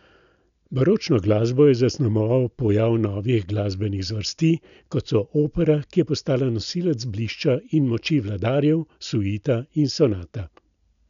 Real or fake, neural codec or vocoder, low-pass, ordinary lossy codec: real; none; 7.2 kHz; none